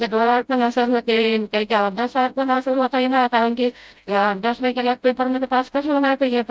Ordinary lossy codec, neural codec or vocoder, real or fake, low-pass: none; codec, 16 kHz, 0.5 kbps, FreqCodec, smaller model; fake; none